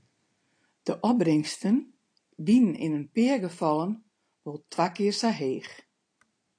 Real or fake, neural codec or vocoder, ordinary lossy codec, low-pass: real; none; AAC, 48 kbps; 9.9 kHz